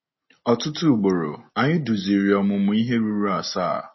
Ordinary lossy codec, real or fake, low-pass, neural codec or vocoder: MP3, 24 kbps; real; 7.2 kHz; none